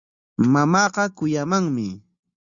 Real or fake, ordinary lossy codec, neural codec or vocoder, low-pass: real; Opus, 64 kbps; none; 7.2 kHz